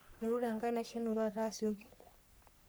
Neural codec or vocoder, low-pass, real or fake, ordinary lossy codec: codec, 44.1 kHz, 3.4 kbps, Pupu-Codec; none; fake; none